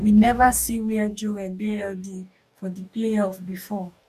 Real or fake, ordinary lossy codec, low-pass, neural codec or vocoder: fake; none; 14.4 kHz; codec, 44.1 kHz, 2.6 kbps, DAC